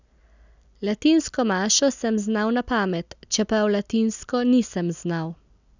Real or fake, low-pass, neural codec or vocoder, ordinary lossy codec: real; 7.2 kHz; none; none